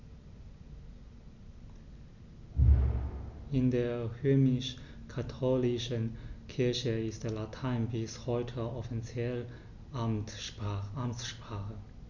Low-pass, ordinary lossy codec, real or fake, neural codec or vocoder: 7.2 kHz; none; real; none